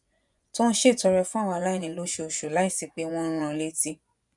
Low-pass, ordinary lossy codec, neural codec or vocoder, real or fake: 10.8 kHz; none; vocoder, 24 kHz, 100 mel bands, Vocos; fake